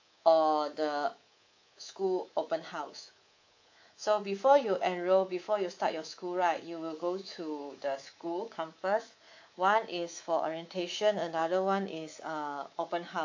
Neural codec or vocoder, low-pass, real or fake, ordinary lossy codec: codec, 24 kHz, 3.1 kbps, DualCodec; 7.2 kHz; fake; AAC, 48 kbps